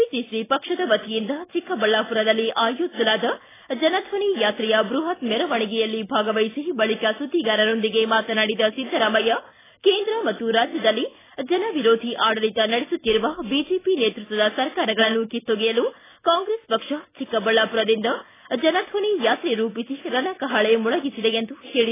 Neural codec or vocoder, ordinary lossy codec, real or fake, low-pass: none; AAC, 16 kbps; real; 3.6 kHz